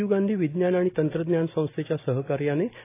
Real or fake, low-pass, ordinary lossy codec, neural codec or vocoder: real; 3.6 kHz; AAC, 24 kbps; none